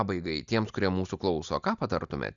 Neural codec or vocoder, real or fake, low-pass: none; real; 7.2 kHz